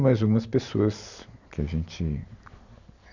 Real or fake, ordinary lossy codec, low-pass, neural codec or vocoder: real; none; 7.2 kHz; none